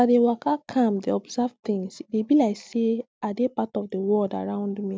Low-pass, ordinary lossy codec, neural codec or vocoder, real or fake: none; none; none; real